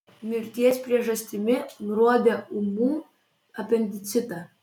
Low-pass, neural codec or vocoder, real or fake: 19.8 kHz; none; real